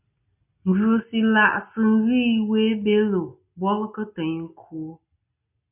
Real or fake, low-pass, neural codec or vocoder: real; 3.6 kHz; none